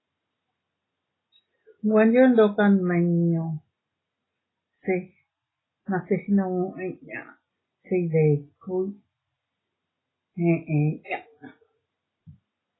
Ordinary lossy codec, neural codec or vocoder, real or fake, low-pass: AAC, 16 kbps; none; real; 7.2 kHz